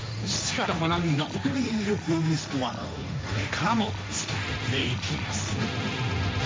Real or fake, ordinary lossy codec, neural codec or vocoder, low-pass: fake; none; codec, 16 kHz, 1.1 kbps, Voila-Tokenizer; none